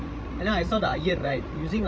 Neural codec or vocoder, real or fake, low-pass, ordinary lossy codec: codec, 16 kHz, 16 kbps, FreqCodec, larger model; fake; none; none